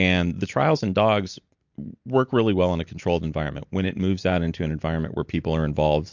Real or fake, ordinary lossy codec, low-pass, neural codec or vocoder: real; MP3, 64 kbps; 7.2 kHz; none